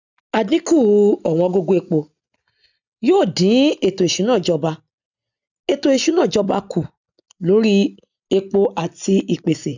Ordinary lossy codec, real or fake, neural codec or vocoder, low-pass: none; real; none; 7.2 kHz